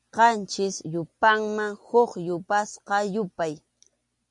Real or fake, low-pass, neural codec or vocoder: real; 10.8 kHz; none